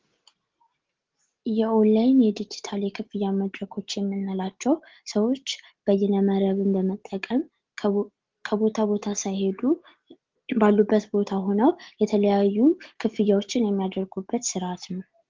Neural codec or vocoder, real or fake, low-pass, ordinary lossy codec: none; real; 7.2 kHz; Opus, 16 kbps